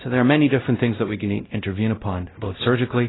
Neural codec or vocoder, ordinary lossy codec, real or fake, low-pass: codec, 16 kHz, 0.5 kbps, X-Codec, WavLM features, trained on Multilingual LibriSpeech; AAC, 16 kbps; fake; 7.2 kHz